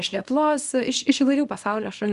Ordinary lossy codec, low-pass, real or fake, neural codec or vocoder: Opus, 64 kbps; 10.8 kHz; fake; codec, 24 kHz, 0.9 kbps, WavTokenizer, small release